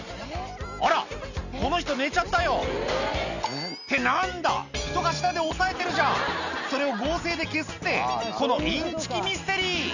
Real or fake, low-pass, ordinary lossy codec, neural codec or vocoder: real; 7.2 kHz; none; none